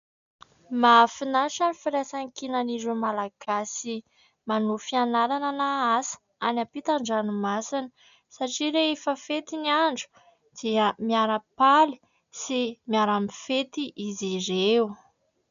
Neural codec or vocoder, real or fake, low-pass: none; real; 7.2 kHz